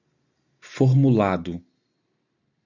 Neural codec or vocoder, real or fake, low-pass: none; real; 7.2 kHz